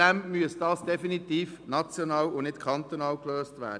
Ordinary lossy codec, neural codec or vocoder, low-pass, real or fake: none; none; 9.9 kHz; real